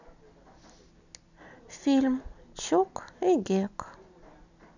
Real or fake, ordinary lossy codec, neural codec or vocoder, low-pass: real; none; none; 7.2 kHz